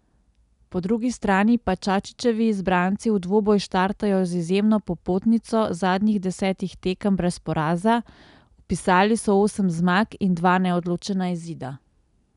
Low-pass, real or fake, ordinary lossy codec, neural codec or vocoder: 10.8 kHz; real; none; none